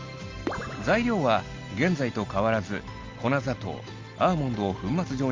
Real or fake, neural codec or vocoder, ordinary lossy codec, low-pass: real; none; Opus, 32 kbps; 7.2 kHz